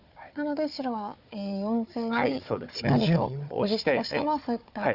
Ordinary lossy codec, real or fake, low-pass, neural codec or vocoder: none; fake; 5.4 kHz; codec, 16 kHz, 4 kbps, FunCodec, trained on Chinese and English, 50 frames a second